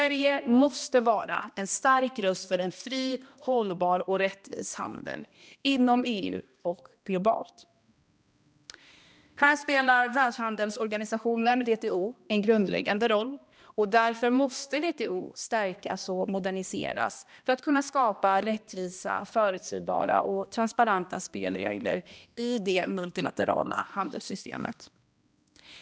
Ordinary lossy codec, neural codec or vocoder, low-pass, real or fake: none; codec, 16 kHz, 1 kbps, X-Codec, HuBERT features, trained on balanced general audio; none; fake